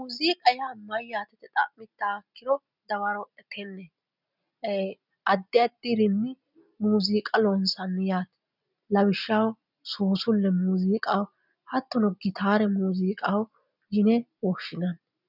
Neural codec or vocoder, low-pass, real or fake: none; 5.4 kHz; real